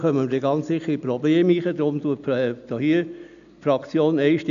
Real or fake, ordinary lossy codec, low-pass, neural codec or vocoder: real; none; 7.2 kHz; none